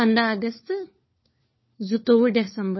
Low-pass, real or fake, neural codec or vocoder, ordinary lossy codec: 7.2 kHz; fake; codec, 16 kHz, 4 kbps, FunCodec, trained on LibriTTS, 50 frames a second; MP3, 24 kbps